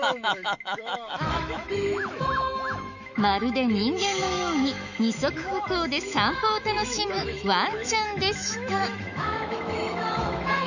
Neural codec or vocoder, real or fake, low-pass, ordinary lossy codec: autoencoder, 48 kHz, 128 numbers a frame, DAC-VAE, trained on Japanese speech; fake; 7.2 kHz; none